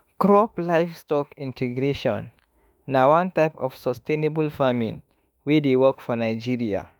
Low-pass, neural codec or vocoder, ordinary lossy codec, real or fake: none; autoencoder, 48 kHz, 32 numbers a frame, DAC-VAE, trained on Japanese speech; none; fake